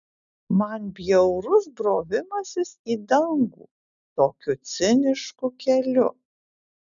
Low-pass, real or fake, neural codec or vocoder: 7.2 kHz; real; none